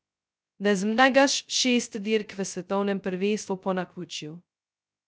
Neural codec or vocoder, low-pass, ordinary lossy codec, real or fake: codec, 16 kHz, 0.2 kbps, FocalCodec; none; none; fake